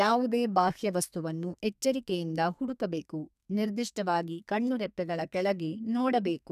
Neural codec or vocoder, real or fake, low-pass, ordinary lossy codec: codec, 32 kHz, 1.9 kbps, SNAC; fake; 14.4 kHz; none